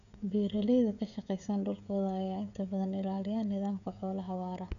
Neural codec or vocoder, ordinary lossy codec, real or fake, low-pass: codec, 16 kHz, 16 kbps, FreqCodec, smaller model; none; fake; 7.2 kHz